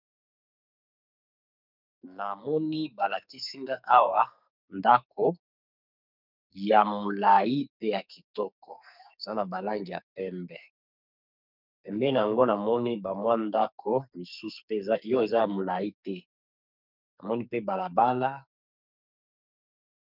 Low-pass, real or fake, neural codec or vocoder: 5.4 kHz; fake; codec, 44.1 kHz, 2.6 kbps, SNAC